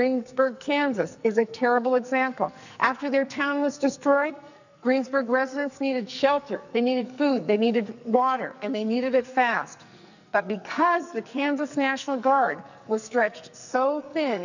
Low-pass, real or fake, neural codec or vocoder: 7.2 kHz; fake; codec, 44.1 kHz, 2.6 kbps, SNAC